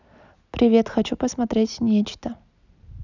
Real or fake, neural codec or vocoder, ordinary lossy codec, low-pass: real; none; none; 7.2 kHz